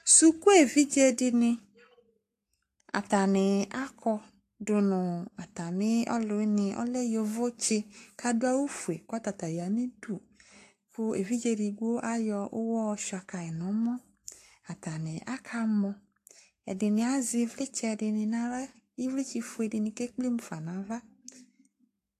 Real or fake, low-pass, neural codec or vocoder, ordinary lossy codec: fake; 14.4 kHz; codec, 44.1 kHz, 7.8 kbps, Pupu-Codec; AAC, 64 kbps